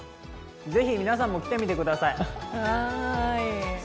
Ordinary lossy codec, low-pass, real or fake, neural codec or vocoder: none; none; real; none